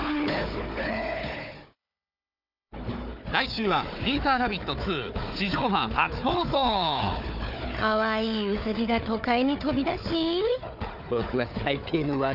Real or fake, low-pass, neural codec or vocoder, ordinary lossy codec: fake; 5.4 kHz; codec, 16 kHz, 4 kbps, FunCodec, trained on Chinese and English, 50 frames a second; none